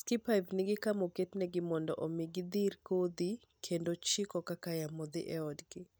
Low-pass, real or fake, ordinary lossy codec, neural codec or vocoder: none; real; none; none